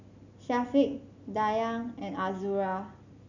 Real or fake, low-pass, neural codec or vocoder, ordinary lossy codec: real; 7.2 kHz; none; none